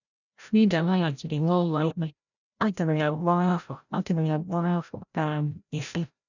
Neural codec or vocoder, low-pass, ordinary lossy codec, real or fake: codec, 16 kHz, 0.5 kbps, FreqCodec, larger model; 7.2 kHz; none; fake